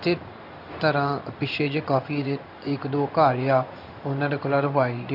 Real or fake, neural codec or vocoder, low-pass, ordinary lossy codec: fake; codec, 16 kHz in and 24 kHz out, 1 kbps, XY-Tokenizer; 5.4 kHz; none